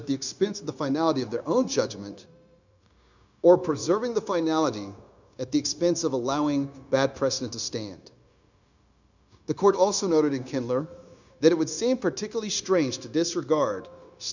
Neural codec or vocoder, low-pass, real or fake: codec, 16 kHz, 0.9 kbps, LongCat-Audio-Codec; 7.2 kHz; fake